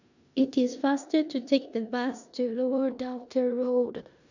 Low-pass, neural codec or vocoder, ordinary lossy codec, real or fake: 7.2 kHz; codec, 16 kHz in and 24 kHz out, 0.9 kbps, LongCat-Audio-Codec, four codebook decoder; none; fake